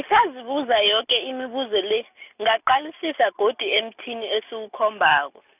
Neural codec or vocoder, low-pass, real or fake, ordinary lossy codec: none; 3.6 kHz; real; none